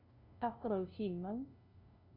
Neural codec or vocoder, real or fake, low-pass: codec, 16 kHz, 0.5 kbps, FunCodec, trained on LibriTTS, 25 frames a second; fake; 5.4 kHz